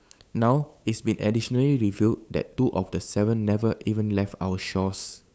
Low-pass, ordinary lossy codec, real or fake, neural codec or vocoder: none; none; fake; codec, 16 kHz, 8 kbps, FunCodec, trained on LibriTTS, 25 frames a second